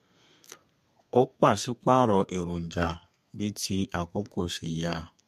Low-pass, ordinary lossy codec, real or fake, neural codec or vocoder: 14.4 kHz; MP3, 64 kbps; fake; codec, 32 kHz, 1.9 kbps, SNAC